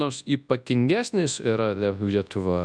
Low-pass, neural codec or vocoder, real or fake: 9.9 kHz; codec, 24 kHz, 0.9 kbps, WavTokenizer, large speech release; fake